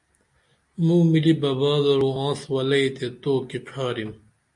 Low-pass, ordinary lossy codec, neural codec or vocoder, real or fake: 10.8 kHz; MP3, 64 kbps; none; real